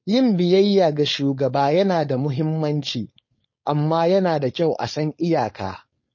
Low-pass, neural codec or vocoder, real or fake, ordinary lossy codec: 7.2 kHz; codec, 16 kHz, 4.8 kbps, FACodec; fake; MP3, 32 kbps